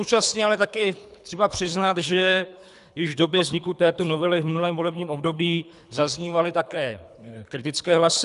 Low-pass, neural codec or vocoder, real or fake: 10.8 kHz; codec, 24 kHz, 3 kbps, HILCodec; fake